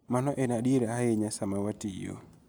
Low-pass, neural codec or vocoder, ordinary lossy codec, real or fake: none; none; none; real